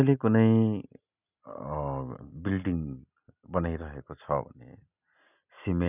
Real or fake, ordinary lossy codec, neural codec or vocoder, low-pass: real; none; none; 3.6 kHz